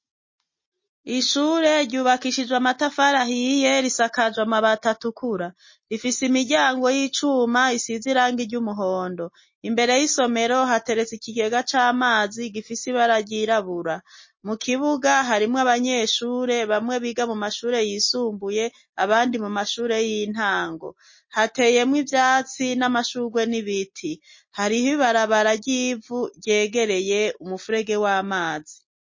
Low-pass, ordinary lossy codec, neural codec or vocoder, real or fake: 7.2 kHz; MP3, 32 kbps; none; real